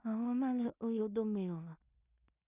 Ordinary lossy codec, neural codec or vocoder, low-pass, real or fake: none; codec, 16 kHz in and 24 kHz out, 0.4 kbps, LongCat-Audio-Codec, two codebook decoder; 3.6 kHz; fake